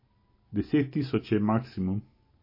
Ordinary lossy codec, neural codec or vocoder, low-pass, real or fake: MP3, 24 kbps; none; 5.4 kHz; real